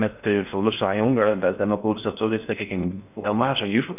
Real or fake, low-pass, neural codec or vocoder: fake; 3.6 kHz; codec, 16 kHz in and 24 kHz out, 0.6 kbps, FocalCodec, streaming, 4096 codes